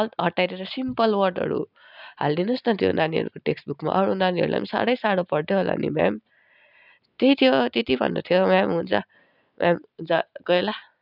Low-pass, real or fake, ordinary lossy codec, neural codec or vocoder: 5.4 kHz; real; none; none